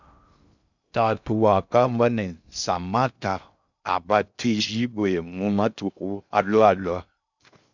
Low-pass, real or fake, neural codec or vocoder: 7.2 kHz; fake; codec, 16 kHz in and 24 kHz out, 0.6 kbps, FocalCodec, streaming, 2048 codes